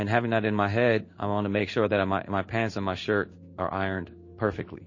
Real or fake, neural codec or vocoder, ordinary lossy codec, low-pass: fake; codec, 16 kHz in and 24 kHz out, 1 kbps, XY-Tokenizer; MP3, 32 kbps; 7.2 kHz